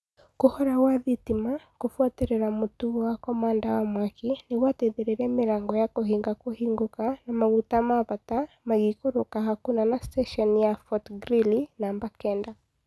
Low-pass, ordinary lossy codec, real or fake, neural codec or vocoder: none; none; real; none